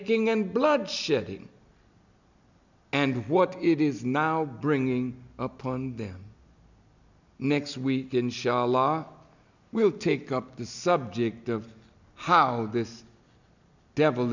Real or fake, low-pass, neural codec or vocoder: real; 7.2 kHz; none